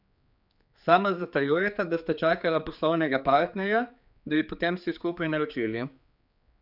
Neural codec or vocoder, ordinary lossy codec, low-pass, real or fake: codec, 16 kHz, 4 kbps, X-Codec, HuBERT features, trained on general audio; none; 5.4 kHz; fake